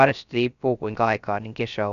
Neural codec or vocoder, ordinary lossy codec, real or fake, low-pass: codec, 16 kHz, 0.3 kbps, FocalCodec; none; fake; 7.2 kHz